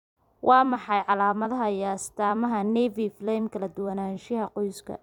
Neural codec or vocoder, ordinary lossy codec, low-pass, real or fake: vocoder, 44.1 kHz, 128 mel bands every 256 samples, BigVGAN v2; none; 19.8 kHz; fake